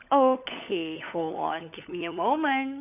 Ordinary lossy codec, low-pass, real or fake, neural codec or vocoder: none; 3.6 kHz; fake; codec, 16 kHz, 16 kbps, FunCodec, trained on LibriTTS, 50 frames a second